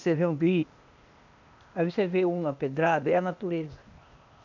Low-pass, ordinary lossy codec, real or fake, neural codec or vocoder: 7.2 kHz; none; fake; codec, 16 kHz, 0.8 kbps, ZipCodec